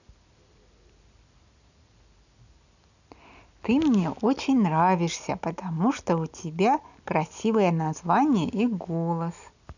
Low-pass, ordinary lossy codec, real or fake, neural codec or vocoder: 7.2 kHz; none; real; none